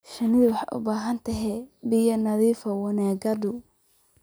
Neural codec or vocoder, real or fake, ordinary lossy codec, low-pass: none; real; none; none